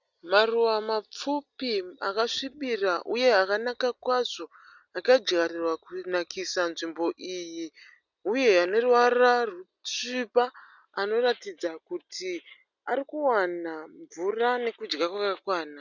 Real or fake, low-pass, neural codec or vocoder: real; 7.2 kHz; none